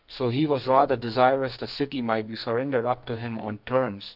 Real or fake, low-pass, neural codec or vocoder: fake; 5.4 kHz; codec, 44.1 kHz, 2.6 kbps, SNAC